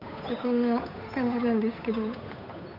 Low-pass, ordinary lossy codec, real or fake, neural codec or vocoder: 5.4 kHz; none; fake; codec, 16 kHz, 8 kbps, FunCodec, trained on Chinese and English, 25 frames a second